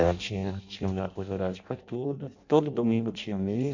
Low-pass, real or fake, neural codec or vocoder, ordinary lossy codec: 7.2 kHz; fake; codec, 16 kHz in and 24 kHz out, 0.6 kbps, FireRedTTS-2 codec; none